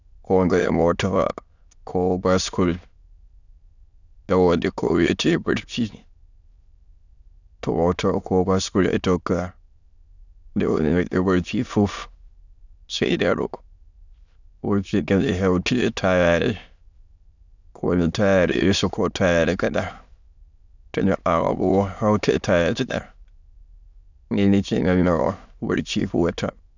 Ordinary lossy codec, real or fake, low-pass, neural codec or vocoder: none; fake; 7.2 kHz; autoencoder, 22.05 kHz, a latent of 192 numbers a frame, VITS, trained on many speakers